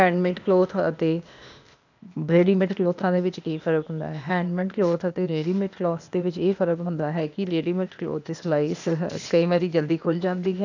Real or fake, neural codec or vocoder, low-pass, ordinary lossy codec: fake; codec, 16 kHz, 0.8 kbps, ZipCodec; 7.2 kHz; none